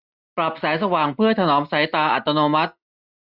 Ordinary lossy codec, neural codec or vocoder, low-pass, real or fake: Opus, 64 kbps; none; 5.4 kHz; real